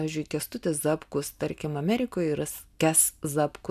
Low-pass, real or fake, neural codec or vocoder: 14.4 kHz; real; none